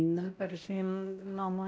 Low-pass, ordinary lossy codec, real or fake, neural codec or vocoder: none; none; fake; codec, 16 kHz, 0.5 kbps, X-Codec, WavLM features, trained on Multilingual LibriSpeech